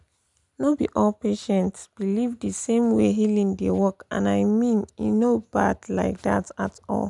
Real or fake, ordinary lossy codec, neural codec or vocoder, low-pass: real; none; none; 10.8 kHz